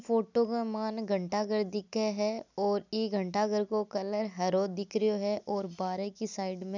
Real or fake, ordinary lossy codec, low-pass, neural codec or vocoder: real; none; 7.2 kHz; none